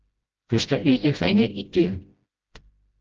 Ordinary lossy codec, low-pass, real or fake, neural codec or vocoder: Opus, 24 kbps; 7.2 kHz; fake; codec, 16 kHz, 0.5 kbps, FreqCodec, smaller model